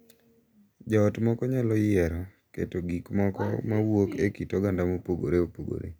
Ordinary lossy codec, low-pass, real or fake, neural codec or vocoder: none; none; real; none